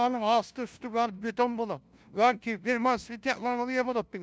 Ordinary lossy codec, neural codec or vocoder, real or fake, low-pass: none; codec, 16 kHz, 0.5 kbps, FunCodec, trained on LibriTTS, 25 frames a second; fake; none